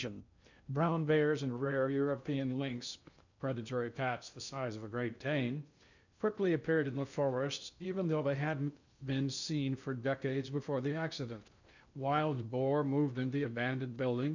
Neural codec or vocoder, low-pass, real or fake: codec, 16 kHz in and 24 kHz out, 0.6 kbps, FocalCodec, streaming, 2048 codes; 7.2 kHz; fake